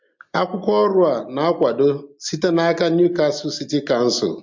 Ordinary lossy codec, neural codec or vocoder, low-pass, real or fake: MP3, 48 kbps; none; 7.2 kHz; real